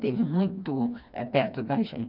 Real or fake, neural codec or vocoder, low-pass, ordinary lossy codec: fake; codec, 16 kHz, 2 kbps, FreqCodec, smaller model; 5.4 kHz; none